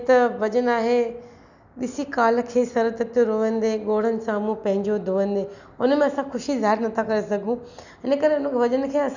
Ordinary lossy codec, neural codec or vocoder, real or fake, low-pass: none; none; real; 7.2 kHz